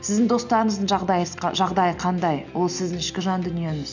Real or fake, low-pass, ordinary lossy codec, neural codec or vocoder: real; 7.2 kHz; none; none